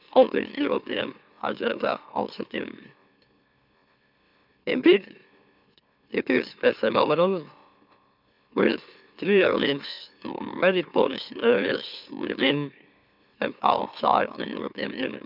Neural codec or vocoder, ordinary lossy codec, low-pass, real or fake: autoencoder, 44.1 kHz, a latent of 192 numbers a frame, MeloTTS; none; 5.4 kHz; fake